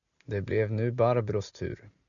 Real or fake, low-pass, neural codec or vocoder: real; 7.2 kHz; none